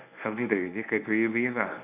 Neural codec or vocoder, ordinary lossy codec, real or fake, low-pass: codec, 24 kHz, 0.9 kbps, WavTokenizer, medium speech release version 1; none; fake; 3.6 kHz